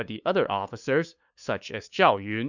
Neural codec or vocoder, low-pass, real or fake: codec, 16 kHz, 2 kbps, FunCodec, trained on LibriTTS, 25 frames a second; 7.2 kHz; fake